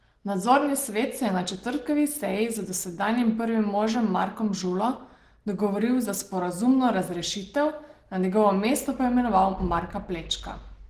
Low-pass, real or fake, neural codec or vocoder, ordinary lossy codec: 14.4 kHz; real; none; Opus, 16 kbps